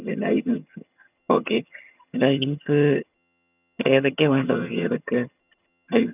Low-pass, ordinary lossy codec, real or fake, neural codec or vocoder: 3.6 kHz; none; fake; vocoder, 22.05 kHz, 80 mel bands, HiFi-GAN